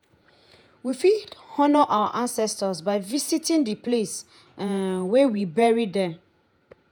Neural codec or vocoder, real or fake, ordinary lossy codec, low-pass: vocoder, 48 kHz, 128 mel bands, Vocos; fake; none; none